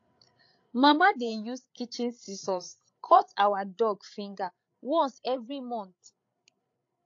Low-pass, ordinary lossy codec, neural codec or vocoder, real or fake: 7.2 kHz; AAC, 48 kbps; codec, 16 kHz, 8 kbps, FreqCodec, larger model; fake